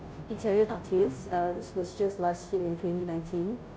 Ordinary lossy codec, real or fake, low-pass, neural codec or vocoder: none; fake; none; codec, 16 kHz, 0.5 kbps, FunCodec, trained on Chinese and English, 25 frames a second